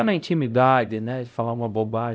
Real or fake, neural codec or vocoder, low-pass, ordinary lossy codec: fake; codec, 16 kHz, 0.5 kbps, X-Codec, HuBERT features, trained on LibriSpeech; none; none